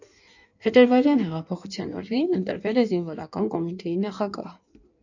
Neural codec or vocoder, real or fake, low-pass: codec, 16 kHz in and 24 kHz out, 1.1 kbps, FireRedTTS-2 codec; fake; 7.2 kHz